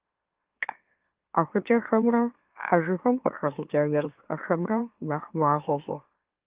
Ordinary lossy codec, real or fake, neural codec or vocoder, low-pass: Opus, 32 kbps; fake; autoencoder, 44.1 kHz, a latent of 192 numbers a frame, MeloTTS; 3.6 kHz